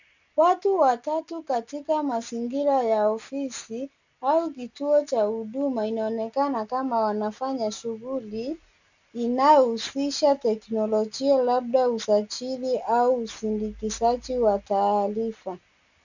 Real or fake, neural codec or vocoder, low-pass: real; none; 7.2 kHz